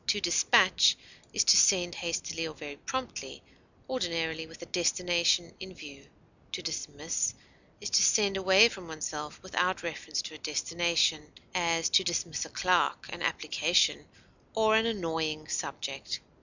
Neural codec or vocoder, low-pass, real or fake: none; 7.2 kHz; real